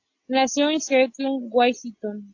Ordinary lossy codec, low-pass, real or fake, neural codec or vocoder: AAC, 48 kbps; 7.2 kHz; real; none